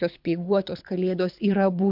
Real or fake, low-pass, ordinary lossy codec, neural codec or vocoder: fake; 5.4 kHz; MP3, 48 kbps; codec, 24 kHz, 6 kbps, HILCodec